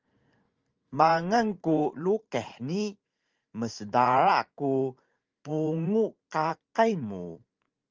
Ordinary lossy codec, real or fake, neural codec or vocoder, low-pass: Opus, 24 kbps; fake; vocoder, 44.1 kHz, 128 mel bands every 512 samples, BigVGAN v2; 7.2 kHz